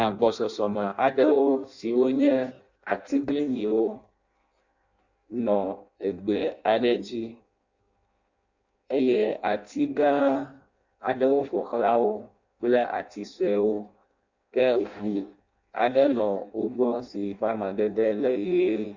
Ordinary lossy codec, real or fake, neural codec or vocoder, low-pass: Opus, 64 kbps; fake; codec, 16 kHz in and 24 kHz out, 0.6 kbps, FireRedTTS-2 codec; 7.2 kHz